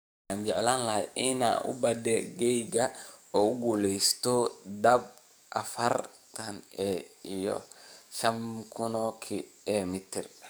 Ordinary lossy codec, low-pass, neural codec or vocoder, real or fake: none; none; codec, 44.1 kHz, 7.8 kbps, DAC; fake